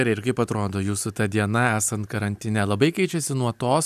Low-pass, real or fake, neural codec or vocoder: 14.4 kHz; real; none